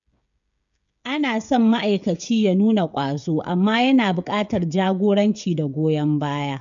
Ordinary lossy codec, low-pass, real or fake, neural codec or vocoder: none; 7.2 kHz; fake; codec, 16 kHz, 16 kbps, FreqCodec, smaller model